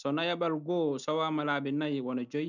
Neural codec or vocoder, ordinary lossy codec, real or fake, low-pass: none; none; real; 7.2 kHz